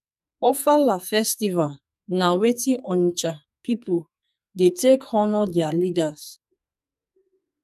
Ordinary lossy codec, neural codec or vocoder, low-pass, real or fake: none; codec, 44.1 kHz, 2.6 kbps, SNAC; 14.4 kHz; fake